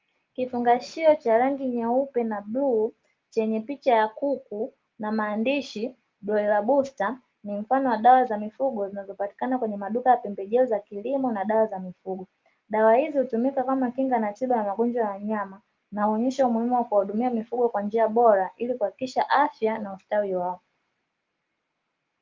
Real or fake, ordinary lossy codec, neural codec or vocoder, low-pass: real; Opus, 32 kbps; none; 7.2 kHz